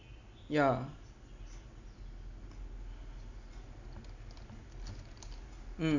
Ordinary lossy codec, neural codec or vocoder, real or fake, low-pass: none; none; real; 7.2 kHz